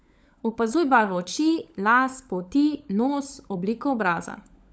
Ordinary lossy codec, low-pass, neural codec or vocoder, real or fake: none; none; codec, 16 kHz, 16 kbps, FunCodec, trained on LibriTTS, 50 frames a second; fake